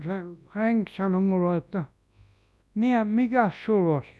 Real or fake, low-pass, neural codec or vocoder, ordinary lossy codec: fake; none; codec, 24 kHz, 0.9 kbps, WavTokenizer, large speech release; none